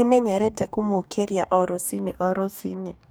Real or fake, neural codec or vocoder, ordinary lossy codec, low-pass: fake; codec, 44.1 kHz, 2.6 kbps, DAC; none; none